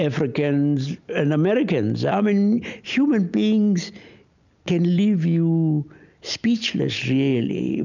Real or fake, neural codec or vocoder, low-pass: real; none; 7.2 kHz